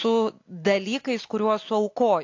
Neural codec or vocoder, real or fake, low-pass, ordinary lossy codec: none; real; 7.2 kHz; AAC, 48 kbps